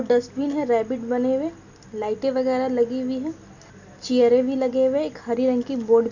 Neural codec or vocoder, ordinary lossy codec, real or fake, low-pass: none; none; real; 7.2 kHz